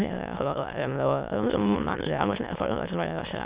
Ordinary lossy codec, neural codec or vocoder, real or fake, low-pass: Opus, 64 kbps; autoencoder, 22.05 kHz, a latent of 192 numbers a frame, VITS, trained on many speakers; fake; 3.6 kHz